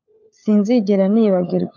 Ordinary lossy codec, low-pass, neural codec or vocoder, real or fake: AAC, 48 kbps; 7.2 kHz; codec, 16 kHz, 4 kbps, FunCodec, trained on LibriTTS, 50 frames a second; fake